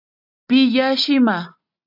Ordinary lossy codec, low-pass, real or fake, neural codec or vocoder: AAC, 32 kbps; 5.4 kHz; real; none